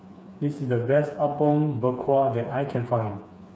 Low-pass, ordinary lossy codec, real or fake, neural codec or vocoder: none; none; fake; codec, 16 kHz, 4 kbps, FreqCodec, smaller model